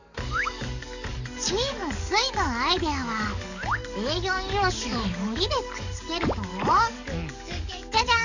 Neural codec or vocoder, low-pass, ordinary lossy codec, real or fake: codec, 44.1 kHz, 7.8 kbps, DAC; 7.2 kHz; none; fake